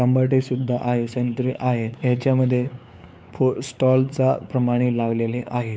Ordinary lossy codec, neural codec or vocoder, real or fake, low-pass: none; codec, 16 kHz, 4 kbps, X-Codec, WavLM features, trained on Multilingual LibriSpeech; fake; none